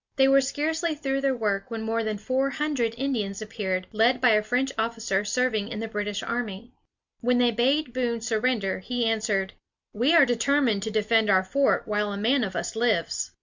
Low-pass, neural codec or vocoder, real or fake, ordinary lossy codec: 7.2 kHz; none; real; Opus, 64 kbps